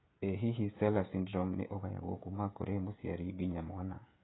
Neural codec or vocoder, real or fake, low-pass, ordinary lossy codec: codec, 16 kHz, 16 kbps, FreqCodec, smaller model; fake; 7.2 kHz; AAC, 16 kbps